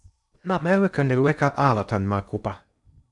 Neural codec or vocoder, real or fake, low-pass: codec, 16 kHz in and 24 kHz out, 0.8 kbps, FocalCodec, streaming, 65536 codes; fake; 10.8 kHz